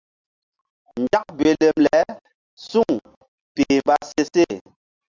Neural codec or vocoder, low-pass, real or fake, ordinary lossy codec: none; 7.2 kHz; real; Opus, 64 kbps